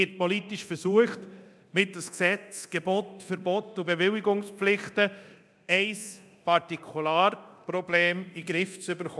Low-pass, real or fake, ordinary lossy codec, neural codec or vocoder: none; fake; none; codec, 24 kHz, 0.9 kbps, DualCodec